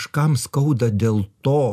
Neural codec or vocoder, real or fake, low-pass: none; real; 14.4 kHz